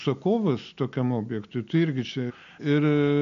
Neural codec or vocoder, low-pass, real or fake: none; 7.2 kHz; real